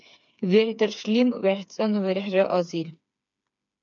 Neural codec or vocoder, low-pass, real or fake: codec, 16 kHz, 4 kbps, FreqCodec, smaller model; 7.2 kHz; fake